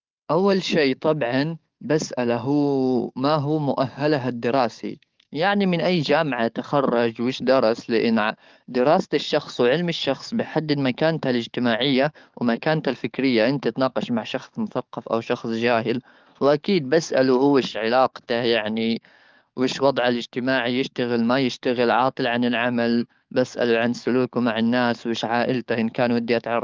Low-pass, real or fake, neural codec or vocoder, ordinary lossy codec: 7.2 kHz; fake; codec, 44.1 kHz, 7.8 kbps, DAC; Opus, 24 kbps